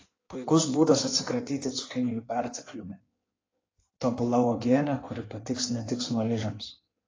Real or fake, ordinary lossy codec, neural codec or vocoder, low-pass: fake; AAC, 32 kbps; codec, 16 kHz in and 24 kHz out, 1.1 kbps, FireRedTTS-2 codec; 7.2 kHz